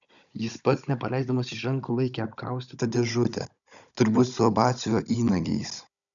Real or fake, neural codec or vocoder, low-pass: fake; codec, 16 kHz, 16 kbps, FunCodec, trained on Chinese and English, 50 frames a second; 7.2 kHz